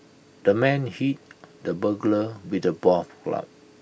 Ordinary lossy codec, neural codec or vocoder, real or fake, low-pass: none; none; real; none